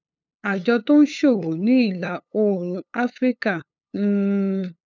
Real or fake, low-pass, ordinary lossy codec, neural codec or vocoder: fake; 7.2 kHz; none; codec, 16 kHz, 8 kbps, FunCodec, trained on LibriTTS, 25 frames a second